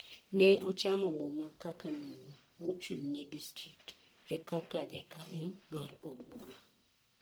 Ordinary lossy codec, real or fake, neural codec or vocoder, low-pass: none; fake; codec, 44.1 kHz, 1.7 kbps, Pupu-Codec; none